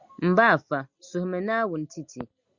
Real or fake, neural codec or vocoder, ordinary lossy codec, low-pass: real; none; Opus, 64 kbps; 7.2 kHz